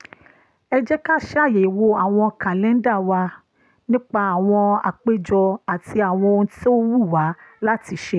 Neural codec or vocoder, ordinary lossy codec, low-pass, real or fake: none; none; none; real